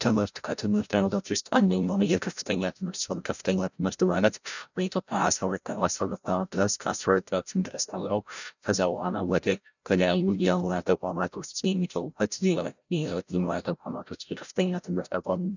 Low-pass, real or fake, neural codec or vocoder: 7.2 kHz; fake; codec, 16 kHz, 0.5 kbps, FreqCodec, larger model